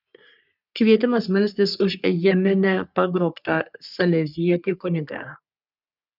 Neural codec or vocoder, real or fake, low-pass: codec, 44.1 kHz, 3.4 kbps, Pupu-Codec; fake; 5.4 kHz